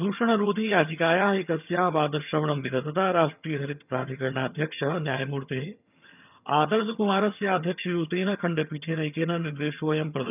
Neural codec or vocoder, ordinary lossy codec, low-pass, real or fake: vocoder, 22.05 kHz, 80 mel bands, HiFi-GAN; none; 3.6 kHz; fake